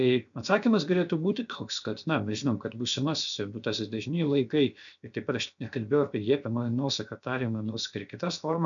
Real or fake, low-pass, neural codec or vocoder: fake; 7.2 kHz; codec, 16 kHz, 0.7 kbps, FocalCodec